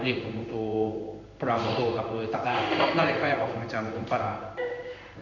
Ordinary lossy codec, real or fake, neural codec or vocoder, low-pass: none; fake; codec, 16 kHz in and 24 kHz out, 1 kbps, XY-Tokenizer; 7.2 kHz